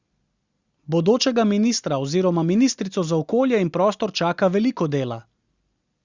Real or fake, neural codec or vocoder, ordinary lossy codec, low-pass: real; none; Opus, 64 kbps; 7.2 kHz